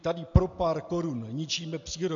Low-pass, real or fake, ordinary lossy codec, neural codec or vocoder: 7.2 kHz; real; MP3, 64 kbps; none